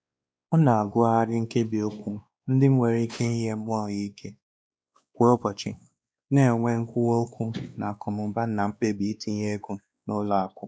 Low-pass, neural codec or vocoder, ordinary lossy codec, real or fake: none; codec, 16 kHz, 2 kbps, X-Codec, WavLM features, trained on Multilingual LibriSpeech; none; fake